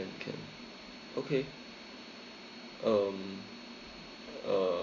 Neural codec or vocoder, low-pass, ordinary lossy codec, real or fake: none; 7.2 kHz; none; real